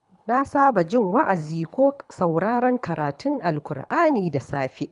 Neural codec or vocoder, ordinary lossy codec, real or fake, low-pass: codec, 24 kHz, 3 kbps, HILCodec; none; fake; 10.8 kHz